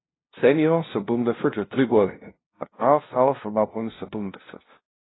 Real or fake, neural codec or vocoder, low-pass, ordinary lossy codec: fake; codec, 16 kHz, 0.5 kbps, FunCodec, trained on LibriTTS, 25 frames a second; 7.2 kHz; AAC, 16 kbps